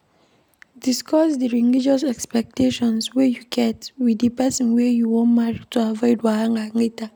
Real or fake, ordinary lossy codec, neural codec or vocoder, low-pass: real; none; none; none